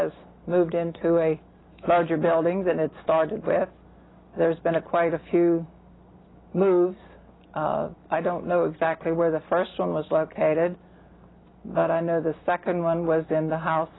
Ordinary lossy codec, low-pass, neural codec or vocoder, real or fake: AAC, 16 kbps; 7.2 kHz; none; real